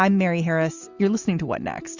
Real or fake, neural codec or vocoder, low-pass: real; none; 7.2 kHz